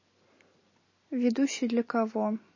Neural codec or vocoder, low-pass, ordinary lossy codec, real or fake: none; 7.2 kHz; MP3, 32 kbps; real